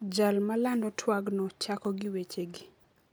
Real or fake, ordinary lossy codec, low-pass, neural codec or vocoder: real; none; none; none